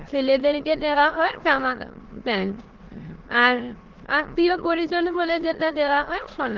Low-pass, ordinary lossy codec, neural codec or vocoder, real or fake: 7.2 kHz; Opus, 16 kbps; autoencoder, 22.05 kHz, a latent of 192 numbers a frame, VITS, trained on many speakers; fake